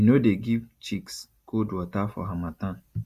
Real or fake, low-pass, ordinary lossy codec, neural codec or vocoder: real; 14.4 kHz; Opus, 64 kbps; none